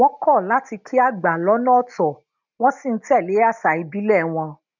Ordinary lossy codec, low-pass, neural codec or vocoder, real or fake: none; 7.2 kHz; none; real